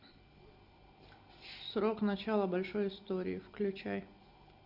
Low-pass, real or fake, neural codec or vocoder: 5.4 kHz; real; none